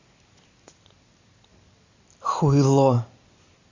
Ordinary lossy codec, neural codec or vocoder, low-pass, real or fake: Opus, 64 kbps; none; 7.2 kHz; real